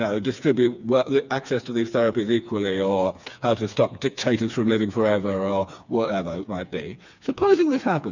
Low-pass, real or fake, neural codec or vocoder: 7.2 kHz; fake; codec, 16 kHz, 4 kbps, FreqCodec, smaller model